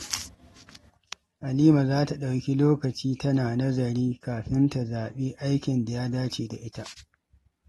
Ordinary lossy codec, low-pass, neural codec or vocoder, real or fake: AAC, 32 kbps; 19.8 kHz; none; real